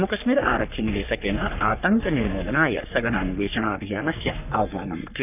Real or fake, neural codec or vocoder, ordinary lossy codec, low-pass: fake; codec, 44.1 kHz, 3.4 kbps, Pupu-Codec; none; 3.6 kHz